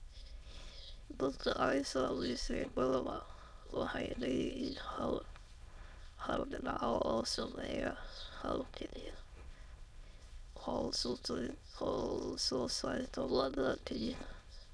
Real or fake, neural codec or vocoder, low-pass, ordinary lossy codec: fake; autoencoder, 22.05 kHz, a latent of 192 numbers a frame, VITS, trained on many speakers; none; none